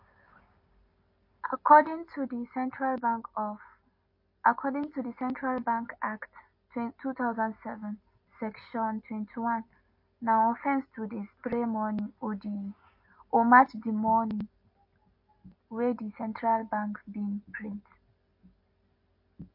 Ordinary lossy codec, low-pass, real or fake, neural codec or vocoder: MP3, 32 kbps; 5.4 kHz; real; none